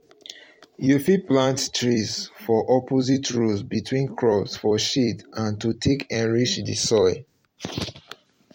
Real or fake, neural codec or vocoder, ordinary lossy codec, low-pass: fake; vocoder, 44.1 kHz, 128 mel bands every 256 samples, BigVGAN v2; MP3, 64 kbps; 19.8 kHz